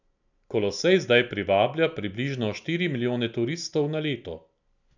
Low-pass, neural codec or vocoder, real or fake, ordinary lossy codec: 7.2 kHz; none; real; none